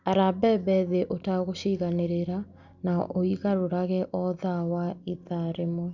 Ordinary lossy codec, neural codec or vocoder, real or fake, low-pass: none; none; real; 7.2 kHz